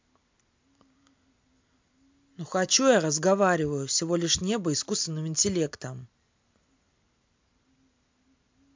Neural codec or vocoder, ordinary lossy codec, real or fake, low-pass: none; MP3, 64 kbps; real; 7.2 kHz